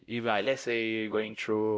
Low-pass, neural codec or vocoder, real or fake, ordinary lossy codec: none; codec, 16 kHz, 0.5 kbps, X-Codec, WavLM features, trained on Multilingual LibriSpeech; fake; none